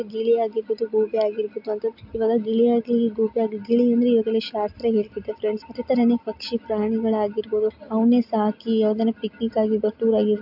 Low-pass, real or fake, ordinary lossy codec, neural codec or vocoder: 5.4 kHz; real; AAC, 48 kbps; none